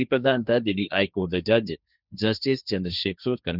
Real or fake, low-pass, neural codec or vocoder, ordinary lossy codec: fake; 5.4 kHz; codec, 16 kHz, 1.1 kbps, Voila-Tokenizer; none